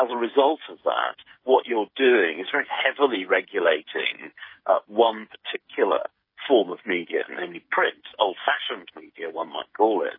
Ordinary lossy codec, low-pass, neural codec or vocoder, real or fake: MP3, 24 kbps; 5.4 kHz; codec, 16 kHz, 16 kbps, FreqCodec, smaller model; fake